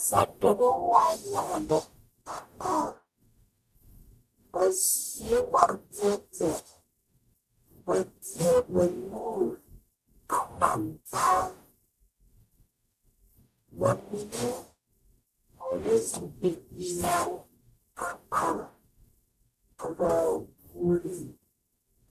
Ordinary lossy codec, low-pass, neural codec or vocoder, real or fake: AAC, 96 kbps; 14.4 kHz; codec, 44.1 kHz, 0.9 kbps, DAC; fake